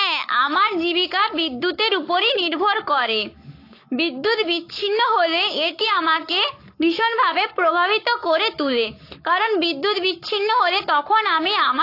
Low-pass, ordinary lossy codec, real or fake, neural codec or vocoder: 5.4 kHz; AAC, 32 kbps; fake; codec, 44.1 kHz, 7.8 kbps, Pupu-Codec